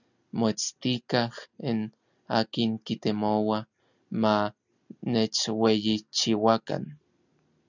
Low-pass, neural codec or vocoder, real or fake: 7.2 kHz; none; real